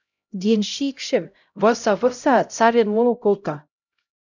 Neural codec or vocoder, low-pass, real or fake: codec, 16 kHz, 0.5 kbps, X-Codec, HuBERT features, trained on LibriSpeech; 7.2 kHz; fake